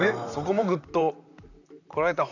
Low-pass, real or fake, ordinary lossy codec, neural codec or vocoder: 7.2 kHz; fake; none; vocoder, 44.1 kHz, 128 mel bands, Pupu-Vocoder